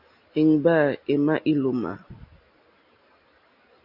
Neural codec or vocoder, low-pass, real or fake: none; 5.4 kHz; real